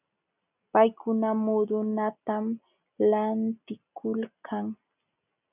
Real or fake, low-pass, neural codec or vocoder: real; 3.6 kHz; none